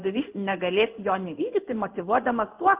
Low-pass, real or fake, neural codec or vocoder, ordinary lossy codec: 3.6 kHz; fake; codec, 16 kHz in and 24 kHz out, 1 kbps, XY-Tokenizer; Opus, 24 kbps